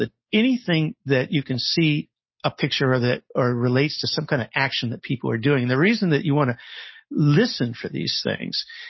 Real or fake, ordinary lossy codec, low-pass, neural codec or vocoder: real; MP3, 24 kbps; 7.2 kHz; none